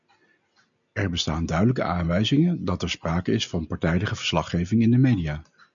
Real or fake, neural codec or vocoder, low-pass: real; none; 7.2 kHz